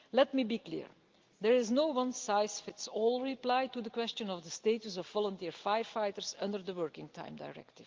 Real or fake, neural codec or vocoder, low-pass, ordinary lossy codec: real; none; 7.2 kHz; Opus, 32 kbps